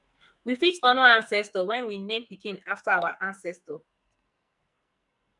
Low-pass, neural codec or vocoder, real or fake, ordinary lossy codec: 10.8 kHz; codec, 44.1 kHz, 2.6 kbps, SNAC; fake; none